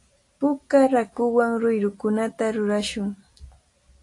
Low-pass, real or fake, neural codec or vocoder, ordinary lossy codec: 10.8 kHz; real; none; AAC, 48 kbps